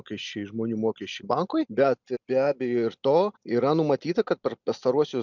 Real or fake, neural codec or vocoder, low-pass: real; none; 7.2 kHz